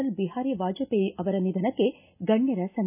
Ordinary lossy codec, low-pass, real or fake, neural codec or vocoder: none; 3.6 kHz; real; none